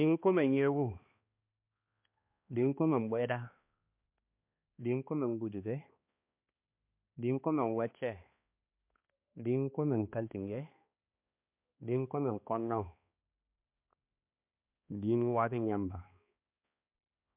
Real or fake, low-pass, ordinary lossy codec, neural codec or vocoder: fake; 3.6 kHz; MP3, 32 kbps; codec, 16 kHz, 2 kbps, X-Codec, HuBERT features, trained on balanced general audio